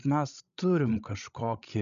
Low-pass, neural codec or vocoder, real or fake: 7.2 kHz; codec, 16 kHz, 16 kbps, FreqCodec, larger model; fake